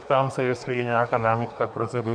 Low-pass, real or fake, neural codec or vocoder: 9.9 kHz; fake; codec, 24 kHz, 1 kbps, SNAC